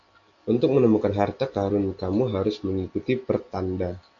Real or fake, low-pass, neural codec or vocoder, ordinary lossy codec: real; 7.2 kHz; none; MP3, 64 kbps